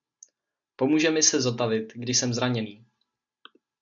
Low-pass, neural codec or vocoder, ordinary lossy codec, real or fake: 7.2 kHz; none; AAC, 64 kbps; real